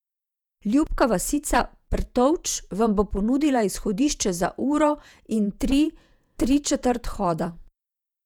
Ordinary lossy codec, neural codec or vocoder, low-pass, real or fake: none; vocoder, 48 kHz, 128 mel bands, Vocos; 19.8 kHz; fake